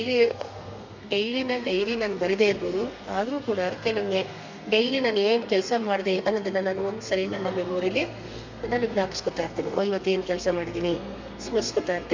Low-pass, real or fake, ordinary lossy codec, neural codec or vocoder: 7.2 kHz; fake; MP3, 64 kbps; codec, 32 kHz, 1.9 kbps, SNAC